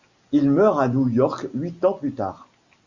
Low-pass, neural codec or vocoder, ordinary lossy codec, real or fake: 7.2 kHz; none; Opus, 64 kbps; real